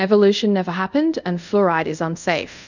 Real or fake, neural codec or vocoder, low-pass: fake; codec, 24 kHz, 0.5 kbps, DualCodec; 7.2 kHz